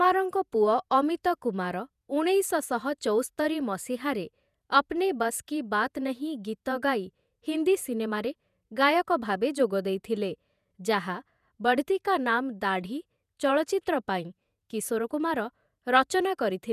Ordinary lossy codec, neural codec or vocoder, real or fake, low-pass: none; vocoder, 48 kHz, 128 mel bands, Vocos; fake; 14.4 kHz